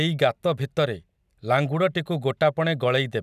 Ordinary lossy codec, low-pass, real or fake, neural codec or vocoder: none; 14.4 kHz; real; none